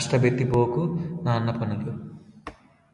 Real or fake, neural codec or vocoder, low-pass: real; none; 10.8 kHz